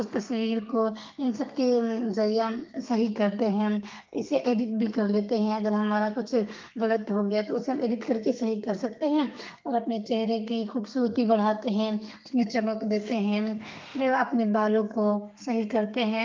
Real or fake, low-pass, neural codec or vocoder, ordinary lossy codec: fake; 7.2 kHz; codec, 32 kHz, 1.9 kbps, SNAC; Opus, 24 kbps